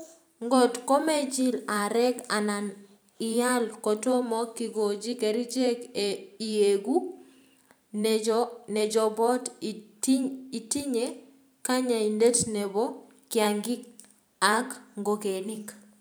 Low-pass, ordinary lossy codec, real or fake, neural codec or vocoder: none; none; fake; vocoder, 44.1 kHz, 128 mel bands every 512 samples, BigVGAN v2